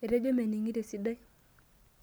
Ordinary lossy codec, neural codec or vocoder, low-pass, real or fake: none; none; none; real